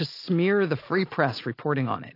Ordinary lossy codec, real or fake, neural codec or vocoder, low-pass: AAC, 32 kbps; real; none; 5.4 kHz